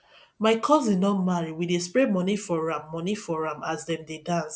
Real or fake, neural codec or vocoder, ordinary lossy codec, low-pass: real; none; none; none